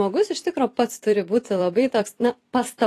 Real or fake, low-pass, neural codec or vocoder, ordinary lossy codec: fake; 14.4 kHz; vocoder, 44.1 kHz, 128 mel bands every 256 samples, BigVGAN v2; AAC, 48 kbps